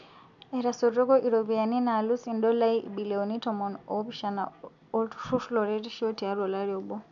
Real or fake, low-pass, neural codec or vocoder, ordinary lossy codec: real; 7.2 kHz; none; none